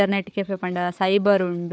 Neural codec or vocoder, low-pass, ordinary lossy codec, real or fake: codec, 16 kHz, 4 kbps, FunCodec, trained on Chinese and English, 50 frames a second; none; none; fake